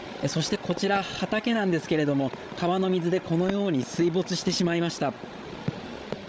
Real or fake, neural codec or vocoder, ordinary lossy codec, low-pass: fake; codec, 16 kHz, 16 kbps, FreqCodec, larger model; none; none